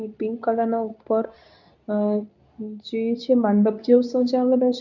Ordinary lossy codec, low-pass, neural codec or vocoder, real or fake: none; 7.2 kHz; codec, 24 kHz, 0.9 kbps, WavTokenizer, medium speech release version 2; fake